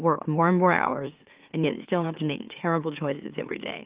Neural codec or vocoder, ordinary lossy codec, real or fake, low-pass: autoencoder, 44.1 kHz, a latent of 192 numbers a frame, MeloTTS; Opus, 32 kbps; fake; 3.6 kHz